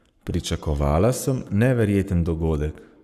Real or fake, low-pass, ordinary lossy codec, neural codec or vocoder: fake; 14.4 kHz; none; codec, 44.1 kHz, 7.8 kbps, Pupu-Codec